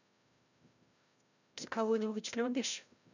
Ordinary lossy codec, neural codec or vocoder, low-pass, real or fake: none; codec, 16 kHz, 0.5 kbps, FreqCodec, larger model; 7.2 kHz; fake